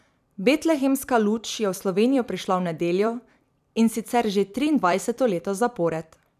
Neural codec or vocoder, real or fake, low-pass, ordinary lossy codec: vocoder, 44.1 kHz, 128 mel bands every 256 samples, BigVGAN v2; fake; 14.4 kHz; none